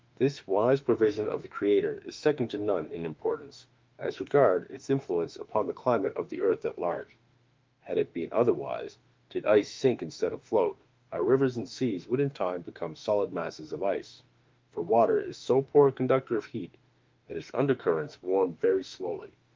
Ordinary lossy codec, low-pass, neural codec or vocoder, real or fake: Opus, 24 kbps; 7.2 kHz; autoencoder, 48 kHz, 32 numbers a frame, DAC-VAE, trained on Japanese speech; fake